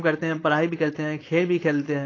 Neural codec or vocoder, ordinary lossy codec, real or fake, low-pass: codec, 16 kHz, 4.8 kbps, FACodec; AAC, 32 kbps; fake; 7.2 kHz